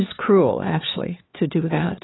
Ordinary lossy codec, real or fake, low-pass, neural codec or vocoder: AAC, 16 kbps; fake; 7.2 kHz; codec, 16 kHz, 4 kbps, X-Codec, HuBERT features, trained on LibriSpeech